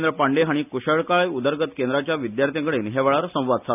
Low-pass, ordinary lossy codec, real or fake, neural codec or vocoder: 3.6 kHz; none; real; none